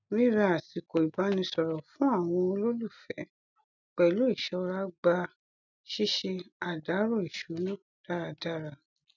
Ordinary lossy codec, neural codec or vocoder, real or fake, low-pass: none; none; real; 7.2 kHz